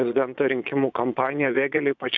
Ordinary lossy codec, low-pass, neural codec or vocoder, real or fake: MP3, 64 kbps; 7.2 kHz; vocoder, 22.05 kHz, 80 mel bands, Vocos; fake